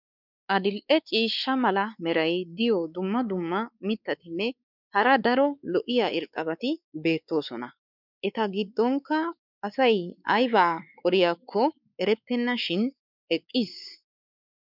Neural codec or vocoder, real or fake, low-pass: codec, 16 kHz, 4 kbps, X-Codec, WavLM features, trained on Multilingual LibriSpeech; fake; 5.4 kHz